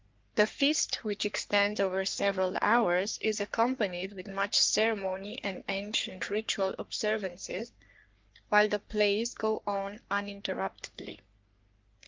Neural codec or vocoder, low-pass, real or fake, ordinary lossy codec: codec, 44.1 kHz, 3.4 kbps, Pupu-Codec; 7.2 kHz; fake; Opus, 32 kbps